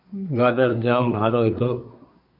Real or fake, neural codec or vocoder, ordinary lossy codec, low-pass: fake; codec, 24 kHz, 1 kbps, SNAC; MP3, 48 kbps; 5.4 kHz